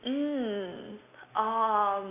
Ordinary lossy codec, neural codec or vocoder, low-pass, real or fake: AAC, 24 kbps; none; 3.6 kHz; real